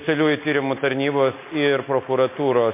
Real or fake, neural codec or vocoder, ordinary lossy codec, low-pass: real; none; MP3, 24 kbps; 3.6 kHz